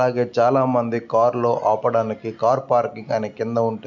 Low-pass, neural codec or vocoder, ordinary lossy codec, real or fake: 7.2 kHz; none; none; real